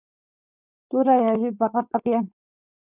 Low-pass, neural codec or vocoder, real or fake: 3.6 kHz; codec, 16 kHz, 4.8 kbps, FACodec; fake